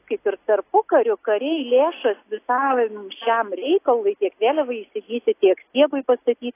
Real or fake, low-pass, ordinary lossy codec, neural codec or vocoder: real; 3.6 kHz; AAC, 24 kbps; none